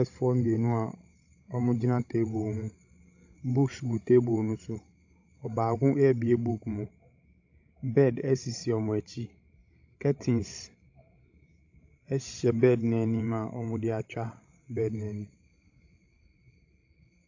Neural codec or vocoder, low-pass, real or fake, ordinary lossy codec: codec, 16 kHz, 16 kbps, FreqCodec, larger model; 7.2 kHz; fake; AAC, 48 kbps